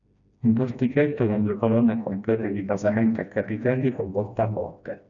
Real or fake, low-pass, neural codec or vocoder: fake; 7.2 kHz; codec, 16 kHz, 1 kbps, FreqCodec, smaller model